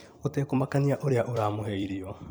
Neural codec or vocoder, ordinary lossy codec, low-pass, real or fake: vocoder, 44.1 kHz, 128 mel bands every 256 samples, BigVGAN v2; none; none; fake